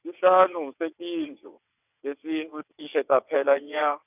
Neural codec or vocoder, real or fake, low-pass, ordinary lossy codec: vocoder, 22.05 kHz, 80 mel bands, WaveNeXt; fake; 3.6 kHz; none